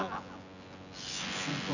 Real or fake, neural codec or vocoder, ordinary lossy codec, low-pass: fake; vocoder, 24 kHz, 100 mel bands, Vocos; none; 7.2 kHz